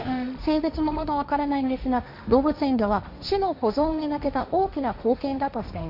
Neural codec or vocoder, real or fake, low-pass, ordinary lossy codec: codec, 16 kHz, 1.1 kbps, Voila-Tokenizer; fake; 5.4 kHz; none